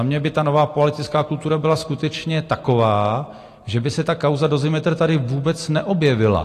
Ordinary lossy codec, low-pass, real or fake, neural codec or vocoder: AAC, 48 kbps; 14.4 kHz; real; none